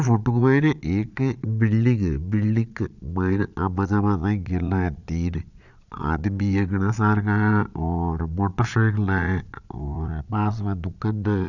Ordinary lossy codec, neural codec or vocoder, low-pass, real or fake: none; vocoder, 22.05 kHz, 80 mel bands, Vocos; 7.2 kHz; fake